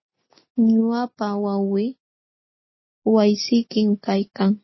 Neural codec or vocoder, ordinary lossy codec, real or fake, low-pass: none; MP3, 24 kbps; real; 7.2 kHz